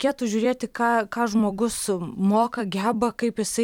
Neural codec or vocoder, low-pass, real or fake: vocoder, 44.1 kHz, 128 mel bands every 256 samples, BigVGAN v2; 19.8 kHz; fake